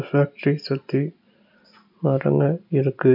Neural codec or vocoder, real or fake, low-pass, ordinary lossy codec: none; real; 5.4 kHz; none